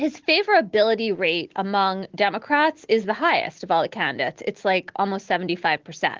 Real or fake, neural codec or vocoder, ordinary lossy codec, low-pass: real; none; Opus, 16 kbps; 7.2 kHz